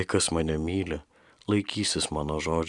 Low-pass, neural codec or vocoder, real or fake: 10.8 kHz; none; real